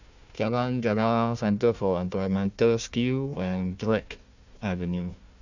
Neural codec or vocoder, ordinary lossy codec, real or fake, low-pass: codec, 16 kHz, 1 kbps, FunCodec, trained on Chinese and English, 50 frames a second; none; fake; 7.2 kHz